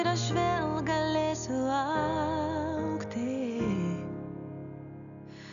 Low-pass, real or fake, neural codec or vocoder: 7.2 kHz; real; none